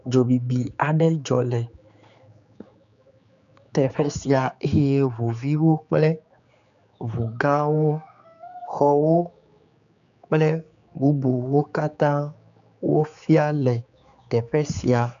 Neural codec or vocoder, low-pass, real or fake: codec, 16 kHz, 4 kbps, X-Codec, HuBERT features, trained on general audio; 7.2 kHz; fake